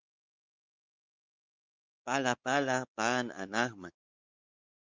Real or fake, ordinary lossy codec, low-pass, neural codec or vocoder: fake; Opus, 32 kbps; 7.2 kHz; codec, 16 kHz, 4 kbps, X-Codec, WavLM features, trained on Multilingual LibriSpeech